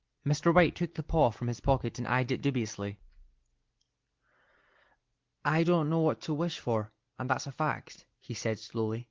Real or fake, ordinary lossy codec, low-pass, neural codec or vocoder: real; Opus, 16 kbps; 7.2 kHz; none